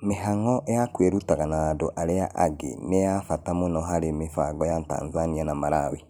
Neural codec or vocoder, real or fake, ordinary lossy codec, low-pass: none; real; none; none